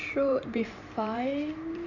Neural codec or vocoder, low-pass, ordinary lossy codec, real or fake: vocoder, 44.1 kHz, 128 mel bands every 512 samples, BigVGAN v2; 7.2 kHz; none; fake